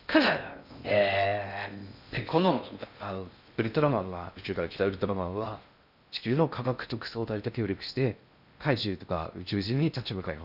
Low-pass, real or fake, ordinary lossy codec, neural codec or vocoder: 5.4 kHz; fake; none; codec, 16 kHz in and 24 kHz out, 0.6 kbps, FocalCodec, streaming, 2048 codes